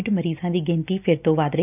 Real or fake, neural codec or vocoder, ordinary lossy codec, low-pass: real; none; none; 3.6 kHz